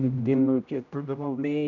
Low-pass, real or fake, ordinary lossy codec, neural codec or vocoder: 7.2 kHz; fake; none; codec, 16 kHz, 0.5 kbps, X-Codec, HuBERT features, trained on general audio